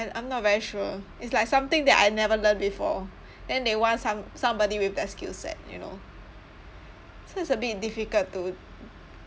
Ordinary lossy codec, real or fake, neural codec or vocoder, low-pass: none; real; none; none